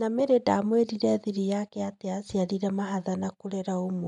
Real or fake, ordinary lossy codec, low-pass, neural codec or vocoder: real; none; 10.8 kHz; none